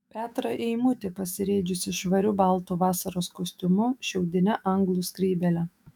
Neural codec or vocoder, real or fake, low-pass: autoencoder, 48 kHz, 128 numbers a frame, DAC-VAE, trained on Japanese speech; fake; 19.8 kHz